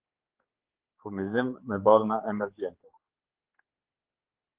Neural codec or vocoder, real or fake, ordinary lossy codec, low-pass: codec, 16 kHz, 4 kbps, X-Codec, HuBERT features, trained on general audio; fake; Opus, 16 kbps; 3.6 kHz